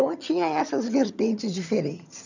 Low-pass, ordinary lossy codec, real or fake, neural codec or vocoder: 7.2 kHz; none; fake; vocoder, 22.05 kHz, 80 mel bands, HiFi-GAN